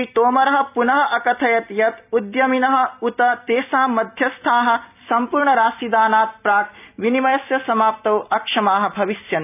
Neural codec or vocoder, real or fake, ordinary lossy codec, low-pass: none; real; none; 3.6 kHz